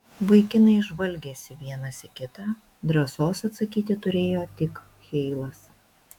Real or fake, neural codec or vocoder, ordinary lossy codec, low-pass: fake; autoencoder, 48 kHz, 128 numbers a frame, DAC-VAE, trained on Japanese speech; MP3, 96 kbps; 19.8 kHz